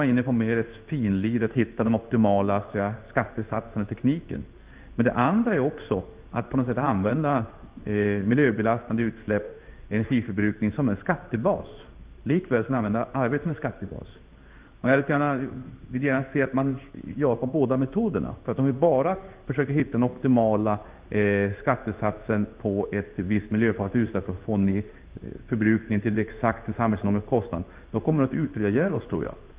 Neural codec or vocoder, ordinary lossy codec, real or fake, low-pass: codec, 16 kHz in and 24 kHz out, 1 kbps, XY-Tokenizer; Opus, 32 kbps; fake; 3.6 kHz